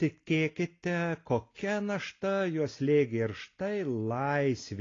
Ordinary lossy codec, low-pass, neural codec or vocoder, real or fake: AAC, 32 kbps; 7.2 kHz; none; real